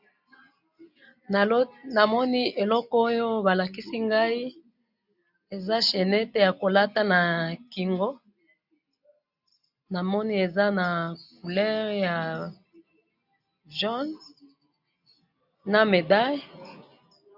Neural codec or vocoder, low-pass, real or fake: none; 5.4 kHz; real